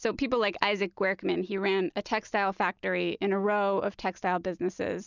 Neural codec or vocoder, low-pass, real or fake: none; 7.2 kHz; real